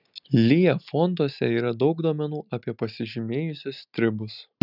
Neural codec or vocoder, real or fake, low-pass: none; real; 5.4 kHz